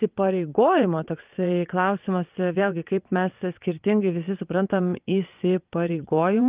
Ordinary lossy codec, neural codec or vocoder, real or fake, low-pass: Opus, 24 kbps; vocoder, 22.05 kHz, 80 mel bands, WaveNeXt; fake; 3.6 kHz